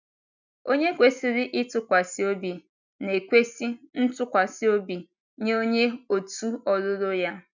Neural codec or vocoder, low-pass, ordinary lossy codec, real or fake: none; 7.2 kHz; none; real